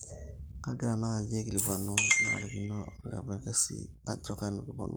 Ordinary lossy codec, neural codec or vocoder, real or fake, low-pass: none; codec, 44.1 kHz, 7.8 kbps, Pupu-Codec; fake; none